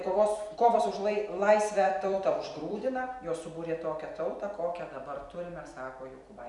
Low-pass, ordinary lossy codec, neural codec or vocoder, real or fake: 10.8 kHz; MP3, 96 kbps; vocoder, 44.1 kHz, 128 mel bands every 256 samples, BigVGAN v2; fake